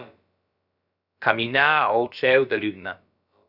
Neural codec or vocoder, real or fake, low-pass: codec, 16 kHz, about 1 kbps, DyCAST, with the encoder's durations; fake; 5.4 kHz